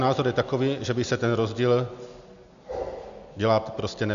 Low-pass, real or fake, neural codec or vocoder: 7.2 kHz; real; none